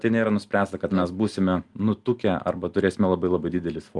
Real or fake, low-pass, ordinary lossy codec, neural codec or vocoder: real; 10.8 kHz; Opus, 32 kbps; none